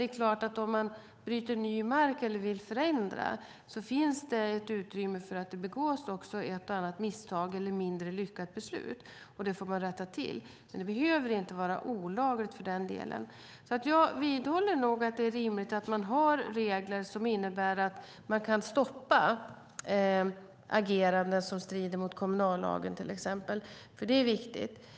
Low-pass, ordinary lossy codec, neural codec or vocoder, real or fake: none; none; codec, 16 kHz, 8 kbps, FunCodec, trained on Chinese and English, 25 frames a second; fake